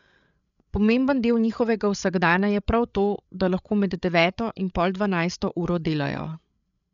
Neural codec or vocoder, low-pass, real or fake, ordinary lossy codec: codec, 16 kHz, 8 kbps, FreqCodec, larger model; 7.2 kHz; fake; none